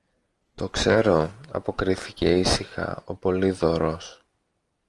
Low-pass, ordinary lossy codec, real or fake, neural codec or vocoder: 10.8 kHz; Opus, 32 kbps; real; none